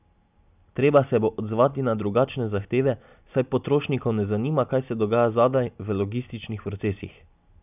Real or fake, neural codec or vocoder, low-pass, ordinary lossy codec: real; none; 3.6 kHz; none